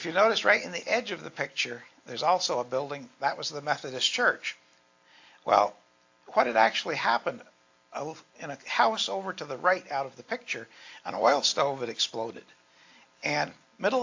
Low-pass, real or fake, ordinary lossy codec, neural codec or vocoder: 7.2 kHz; real; AAC, 48 kbps; none